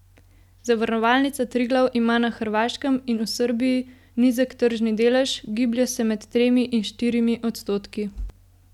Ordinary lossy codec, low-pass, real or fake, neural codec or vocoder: none; 19.8 kHz; real; none